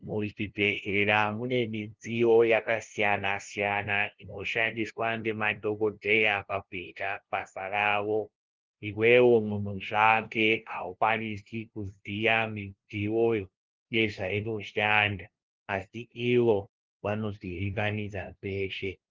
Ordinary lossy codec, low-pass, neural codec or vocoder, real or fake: Opus, 16 kbps; 7.2 kHz; codec, 16 kHz, 0.5 kbps, FunCodec, trained on LibriTTS, 25 frames a second; fake